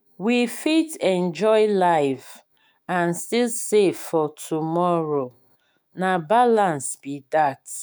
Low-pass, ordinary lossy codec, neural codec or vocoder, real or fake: none; none; autoencoder, 48 kHz, 128 numbers a frame, DAC-VAE, trained on Japanese speech; fake